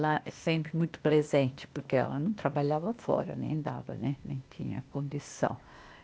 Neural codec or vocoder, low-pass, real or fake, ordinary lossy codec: codec, 16 kHz, 0.8 kbps, ZipCodec; none; fake; none